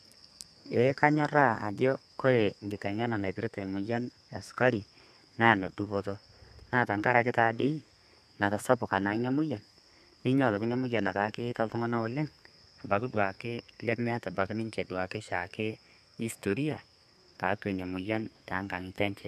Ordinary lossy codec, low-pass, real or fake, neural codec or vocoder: none; 14.4 kHz; fake; codec, 32 kHz, 1.9 kbps, SNAC